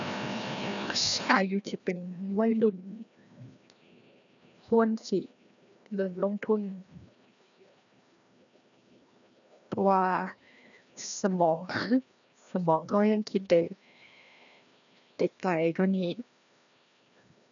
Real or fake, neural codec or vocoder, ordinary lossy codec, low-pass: fake; codec, 16 kHz, 1 kbps, FreqCodec, larger model; none; 7.2 kHz